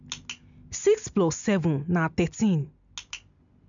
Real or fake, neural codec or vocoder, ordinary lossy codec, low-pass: real; none; none; 7.2 kHz